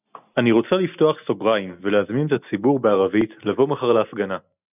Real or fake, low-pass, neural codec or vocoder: real; 3.6 kHz; none